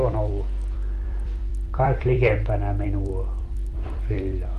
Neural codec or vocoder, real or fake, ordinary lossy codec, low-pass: vocoder, 44.1 kHz, 128 mel bands every 256 samples, BigVGAN v2; fake; Opus, 24 kbps; 14.4 kHz